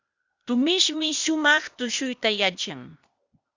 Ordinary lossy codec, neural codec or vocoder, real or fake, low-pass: Opus, 64 kbps; codec, 16 kHz, 0.8 kbps, ZipCodec; fake; 7.2 kHz